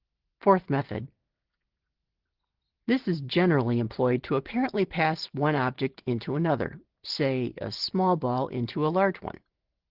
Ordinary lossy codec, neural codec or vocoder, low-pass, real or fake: Opus, 16 kbps; none; 5.4 kHz; real